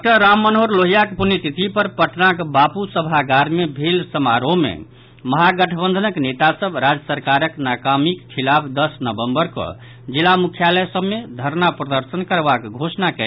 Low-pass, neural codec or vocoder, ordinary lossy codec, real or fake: 5.4 kHz; none; none; real